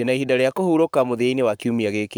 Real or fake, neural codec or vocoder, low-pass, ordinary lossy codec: fake; codec, 44.1 kHz, 7.8 kbps, Pupu-Codec; none; none